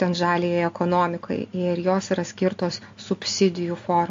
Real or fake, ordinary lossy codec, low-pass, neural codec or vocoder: real; AAC, 48 kbps; 7.2 kHz; none